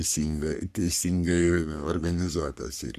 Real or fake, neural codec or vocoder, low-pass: fake; codec, 44.1 kHz, 3.4 kbps, Pupu-Codec; 14.4 kHz